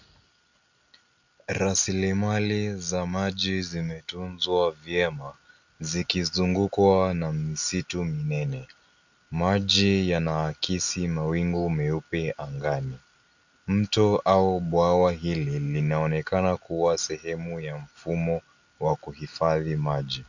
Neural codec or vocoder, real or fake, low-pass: none; real; 7.2 kHz